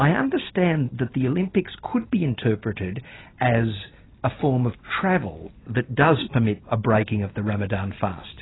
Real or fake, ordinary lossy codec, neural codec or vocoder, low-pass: real; AAC, 16 kbps; none; 7.2 kHz